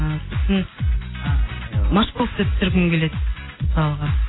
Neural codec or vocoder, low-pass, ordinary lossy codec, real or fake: none; 7.2 kHz; AAC, 16 kbps; real